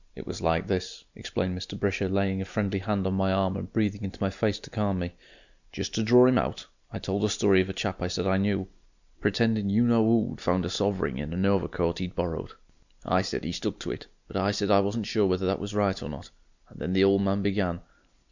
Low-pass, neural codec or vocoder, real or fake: 7.2 kHz; none; real